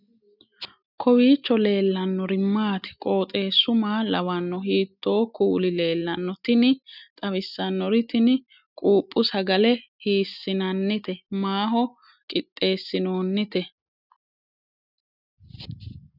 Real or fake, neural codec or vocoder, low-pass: real; none; 5.4 kHz